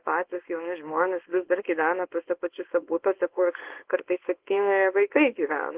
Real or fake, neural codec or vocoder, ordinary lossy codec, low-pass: fake; codec, 24 kHz, 0.9 kbps, WavTokenizer, small release; Opus, 16 kbps; 3.6 kHz